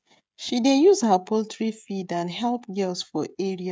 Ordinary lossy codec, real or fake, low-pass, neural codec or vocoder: none; fake; none; codec, 16 kHz, 16 kbps, FreqCodec, smaller model